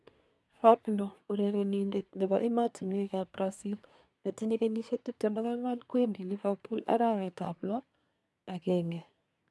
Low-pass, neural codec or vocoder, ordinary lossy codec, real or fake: none; codec, 24 kHz, 1 kbps, SNAC; none; fake